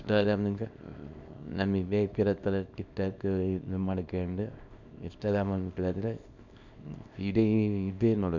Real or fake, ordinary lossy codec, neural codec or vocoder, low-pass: fake; none; codec, 24 kHz, 0.9 kbps, WavTokenizer, small release; 7.2 kHz